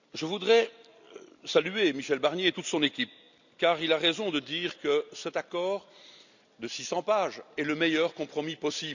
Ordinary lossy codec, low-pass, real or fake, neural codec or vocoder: none; 7.2 kHz; real; none